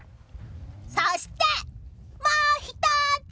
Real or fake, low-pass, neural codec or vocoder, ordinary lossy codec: real; none; none; none